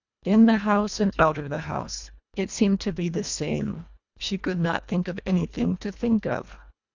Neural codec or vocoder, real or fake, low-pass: codec, 24 kHz, 1.5 kbps, HILCodec; fake; 7.2 kHz